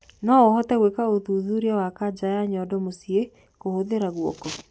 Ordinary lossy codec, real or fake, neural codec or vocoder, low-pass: none; real; none; none